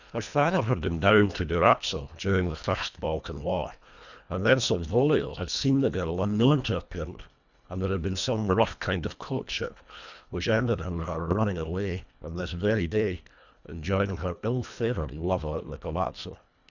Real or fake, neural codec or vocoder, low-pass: fake; codec, 24 kHz, 1.5 kbps, HILCodec; 7.2 kHz